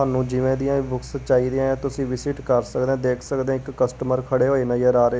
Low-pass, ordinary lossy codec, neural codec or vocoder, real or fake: none; none; none; real